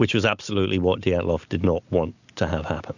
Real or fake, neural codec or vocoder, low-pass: real; none; 7.2 kHz